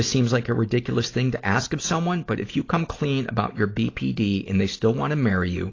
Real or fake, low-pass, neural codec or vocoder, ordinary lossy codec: real; 7.2 kHz; none; AAC, 32 kbps